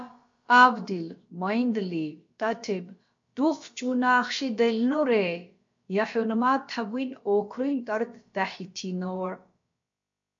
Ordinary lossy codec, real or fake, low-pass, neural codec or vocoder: MP3, 48 kbps; fake; 7.2 kHz; codec, 16 kHz, about 1 kbps, DyCAST, with the encoder's durations